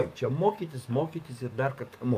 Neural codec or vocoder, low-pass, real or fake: codec, 44.1 kHz, 7.8 kbps, DAC; 14.4 kHz; fake